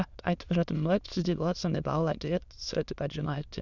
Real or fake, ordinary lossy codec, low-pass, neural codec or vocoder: fake; none; 7.2 kHz; autoencoder, 22.05 kHz, a latent of 192 numbers a frame, VITS, trained on many speakers